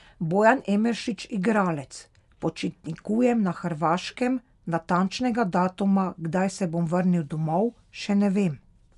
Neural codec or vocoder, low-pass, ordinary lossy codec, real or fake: none; 10.8 kHz; none; real